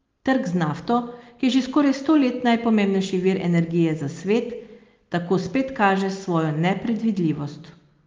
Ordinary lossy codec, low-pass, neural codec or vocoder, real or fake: Opus, 32 kbps; 7.2 kHz; none; real